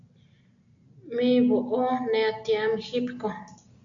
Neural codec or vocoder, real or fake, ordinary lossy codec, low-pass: none; real; MP3, 64 kbps; 7.2 kHz